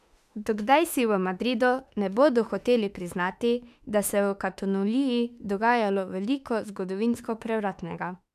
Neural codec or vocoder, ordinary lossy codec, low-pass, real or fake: autoencoder, 48 kHz, 32 numbers a frame, DAC-VAE, trained on Japanese speech; none; 14.4 kHz; fake